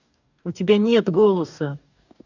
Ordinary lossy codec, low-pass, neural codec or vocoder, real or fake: none; 7.2 kHz; codec, 44.1 kHz, 2.6 kbps, DAC; fake